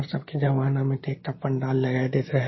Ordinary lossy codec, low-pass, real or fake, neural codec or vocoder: MP3, 24 kbps; 7.2 kHz; real; none